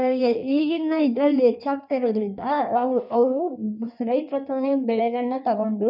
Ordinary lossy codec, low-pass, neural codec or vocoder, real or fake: none; 5.4 kHz; codec, 16 kHz in and 24 kHz out, 1.1 kbps, FireRedTTS-2 codec; fake